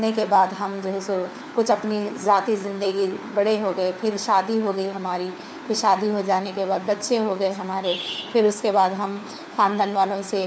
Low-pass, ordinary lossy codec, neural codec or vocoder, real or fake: none; none; codec, 16 kHz, 4 kbps, FunCodec, trained on LibriTTS, 50 frames a second; fake